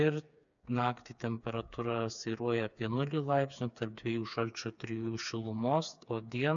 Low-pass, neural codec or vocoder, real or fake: 7.2 kHz; codec, 16 kHz, 4 kbps, FreqCodec, smaller model; fake